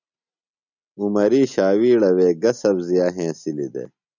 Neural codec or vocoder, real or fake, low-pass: none; real; 7.2 kHz